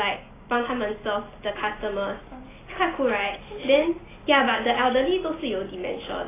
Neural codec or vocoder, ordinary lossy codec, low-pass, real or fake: none; AAC, 16 kbps; 3.6 kHz; real